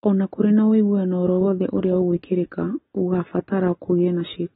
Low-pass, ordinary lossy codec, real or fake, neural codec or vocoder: 7.2 kHz; AAC, 16 kbps; real; none